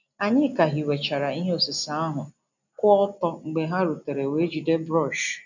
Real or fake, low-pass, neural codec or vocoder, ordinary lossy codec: real; 7.2 kHz; none; none